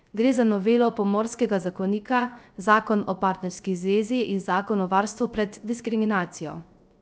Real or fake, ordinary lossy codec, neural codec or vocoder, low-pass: fake; none; codec, 16 kHz, 0.3 kbps, FocalCodec; none